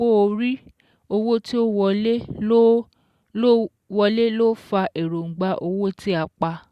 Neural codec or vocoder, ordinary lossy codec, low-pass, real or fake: none; Opus, 64 kbps; 14.4 kHz; real